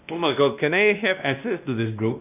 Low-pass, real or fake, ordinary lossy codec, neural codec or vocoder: 3.6 kHz; fake; none; codec, 16 kHz, 1 kbps, X-Codec, WavLM features, trained on Multilingual LibriSpeech